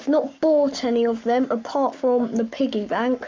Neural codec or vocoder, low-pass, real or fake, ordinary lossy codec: codec, 44.1 kHz, 7.8 kbps, Pupu-Codec; 7.2 kHz; fake; MP3, 64 kbps